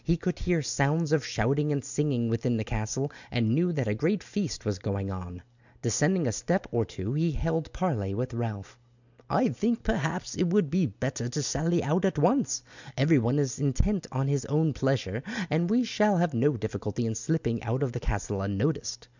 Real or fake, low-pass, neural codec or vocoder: real; 7.2 kHz; none